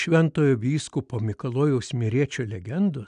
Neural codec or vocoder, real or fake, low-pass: none; real; 9.9 kHz